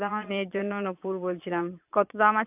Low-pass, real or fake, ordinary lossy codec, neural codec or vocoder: 3.6 kHz; fake; AAC, 32 kbps; vocoder, 22.05 kHz, 80 mel bands, Vocos